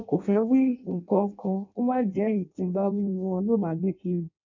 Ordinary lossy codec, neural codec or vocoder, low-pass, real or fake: none; codec, 16 kHz in and 24 kHz out, 0.6 kbps, FireRedTTS-2 codec; 7.2 kHz; fake